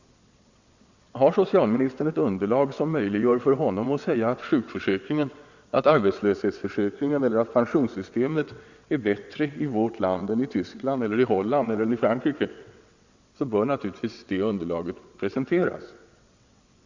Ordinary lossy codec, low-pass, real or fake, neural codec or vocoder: Opus, 64 kbps; 7.2 kHz; fake; vocoder, 22.05 kHz, 80 mel bands, WaveNeXt